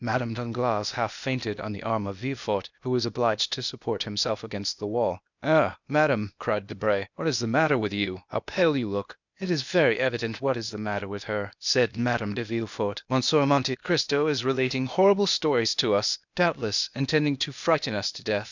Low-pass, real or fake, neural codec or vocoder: 7.2 kHz; fake; codec, 16 kHz, 0.8 kbps, ZipCodec